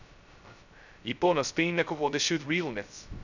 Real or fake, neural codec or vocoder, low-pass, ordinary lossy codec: fake; codec, 16 kHz, 0.2 kbps, FocalCodec; 7.2 kHz; none